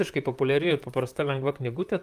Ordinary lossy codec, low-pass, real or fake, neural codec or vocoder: Opus, 32 kbps; 14.4 kHz; fake; vocoder, 44.1 kHz, 128 mel bands, Pupu-Vocoder